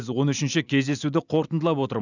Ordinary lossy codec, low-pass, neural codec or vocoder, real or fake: none; 7.2 kHz; none; real